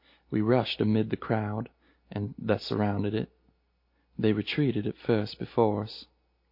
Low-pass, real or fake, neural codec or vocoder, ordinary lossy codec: 5.4 kHz; real; none; MP3, 32 kbps